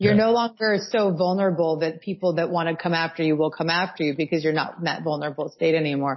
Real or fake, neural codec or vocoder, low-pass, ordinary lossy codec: real; none; 7.2 kHz; MP3, 24 kbps